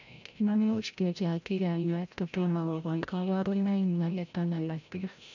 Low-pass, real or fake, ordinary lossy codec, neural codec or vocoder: 7.2 kHz; fake; none; codec, 16 kHz, 0.5 kbps, FreqCodec, larger model